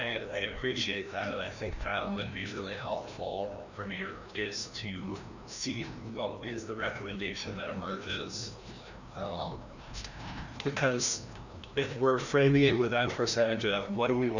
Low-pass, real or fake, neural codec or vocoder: 7.2 kHz; fake; codec, 16 kHz, 1 kbps, FreqCodec, larger model